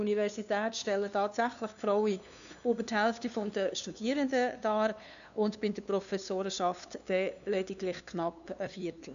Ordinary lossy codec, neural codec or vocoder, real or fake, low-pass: none; codec, 16 kHz, 2 kbps, FunCodec, trained on LibriTTS, 25 frames a second; fake; 7.2 kHz